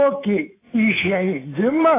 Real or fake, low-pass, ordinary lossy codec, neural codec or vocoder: fake; 3.6 kHz; AAC, 16 kbps; codec, 24 kHz, 3.1 kbps, DualCodec